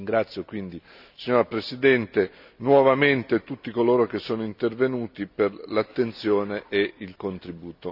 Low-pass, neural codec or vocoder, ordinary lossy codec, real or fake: 5.4 kHz; none; none; real